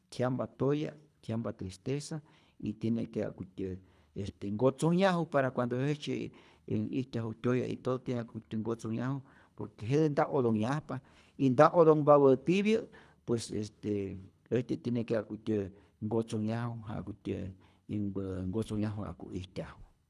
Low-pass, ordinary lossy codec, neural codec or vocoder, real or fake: none; none; codec, 24 kHz, 3 kbps, HILCodec; fake